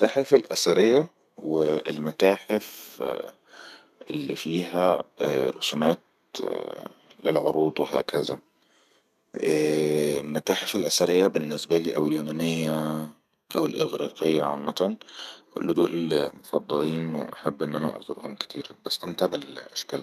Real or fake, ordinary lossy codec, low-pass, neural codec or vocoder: fake; none; 14.4 kHz; codec, 32 kHz, 1.9 kbps, SNAC